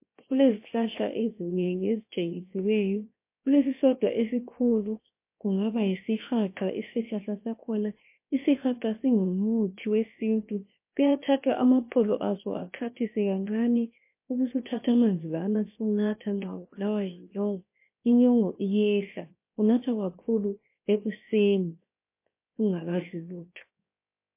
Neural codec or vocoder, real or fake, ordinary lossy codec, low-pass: codec, 16 kHz, 0.7 kbps, FocalCodec; fake; MP3, 24 kbps; 3.6 kHz